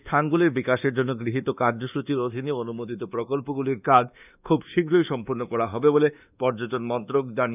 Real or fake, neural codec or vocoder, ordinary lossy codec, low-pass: fake; codec, 24 kHz, 1.2 kbps, DualCodec; none; 3.6 kHz